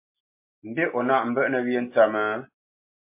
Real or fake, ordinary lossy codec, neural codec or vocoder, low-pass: real; MP3, 24 kbps; none; 3.6 kHz